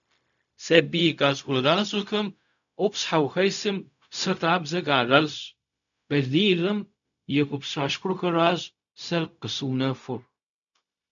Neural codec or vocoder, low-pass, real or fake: codec, 16 kHz, 0.4 kbps, LongCat-Audio-Codec; 7.2 kHz; fake